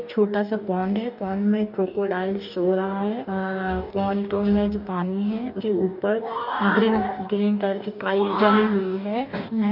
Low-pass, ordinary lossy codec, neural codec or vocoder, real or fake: 5.4 kHz; none; codec, 44.1 kHz, 2.6 kbps, DAC; fake